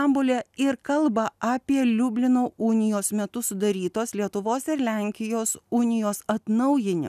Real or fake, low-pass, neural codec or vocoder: real; 14.4 kHz; none